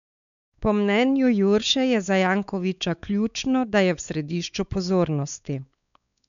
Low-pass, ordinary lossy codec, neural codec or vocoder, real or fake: 7.2 kHz; none; codec, 16 kHz, 4 kbps, X-Codec, WavLM features, trained on Multilingual LibriSpeech; fake